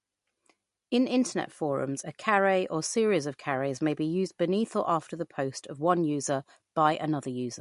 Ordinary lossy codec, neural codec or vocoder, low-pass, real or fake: MP3, 48 kbps; none; 14.4 kHz; real